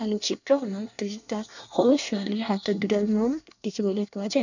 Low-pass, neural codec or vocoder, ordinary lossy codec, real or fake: 7.2 kHz; codec, 24 kHz, 1 kbps, SNAC; none; fake